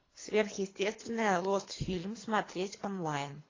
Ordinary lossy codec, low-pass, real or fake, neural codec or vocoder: AAC, 32 kbps; 7.2 kHz; fake; codec, 24 kHz, 3 kbps, HILCodec